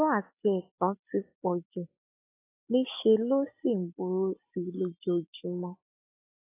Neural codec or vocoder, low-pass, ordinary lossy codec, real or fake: none; 3.6 kHz; AAC, 24 kbps; real